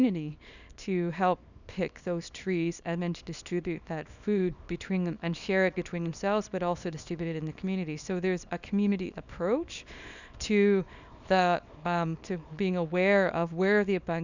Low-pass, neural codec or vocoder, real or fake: 7.2 kHz; codec, 24 kHz, 0.9 kbps, WavTokenizer, small release; fake